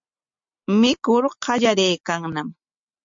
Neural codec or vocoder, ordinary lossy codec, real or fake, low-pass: none; MP3, 48 kbps; real; 7.2 kHz